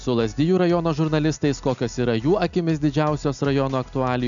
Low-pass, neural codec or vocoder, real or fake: 7.2 kHz; none; real